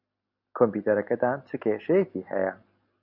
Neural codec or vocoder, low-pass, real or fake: none; 5.4 kHz; real